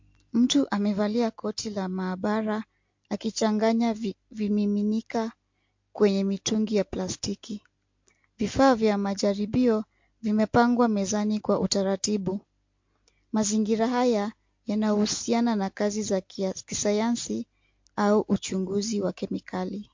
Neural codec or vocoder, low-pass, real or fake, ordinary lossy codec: none; 7.2 kHz; real; MP3, 48 kbps